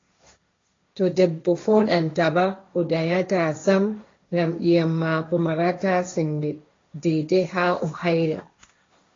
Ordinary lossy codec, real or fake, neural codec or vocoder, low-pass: AAC, 32 kbps; fake; codec, 16 kHz, 1.1 kbps, Voila-Tokenizer; 7.2 kHz